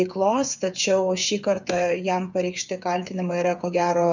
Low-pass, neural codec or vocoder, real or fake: 7.2 kHz; codec, 16 kHz, 8 kbps, FreqCodec, smaller model; fake